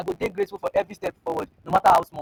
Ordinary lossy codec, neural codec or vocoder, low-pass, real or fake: none; none; none; real